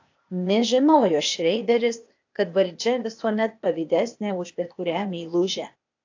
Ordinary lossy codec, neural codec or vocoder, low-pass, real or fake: AAC, 48 kbps; codec, 16 kHz, 0.8 kbps, ZipCodec; 7.2 kHz; fake